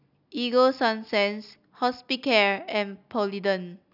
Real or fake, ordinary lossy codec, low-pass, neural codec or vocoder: real; none; 5.4 kHz; none